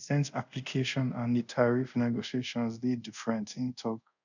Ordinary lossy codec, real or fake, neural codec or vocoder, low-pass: none; fake; codec, 24 kHz, 0.5 kbps, DualCodec; 7.2 kHz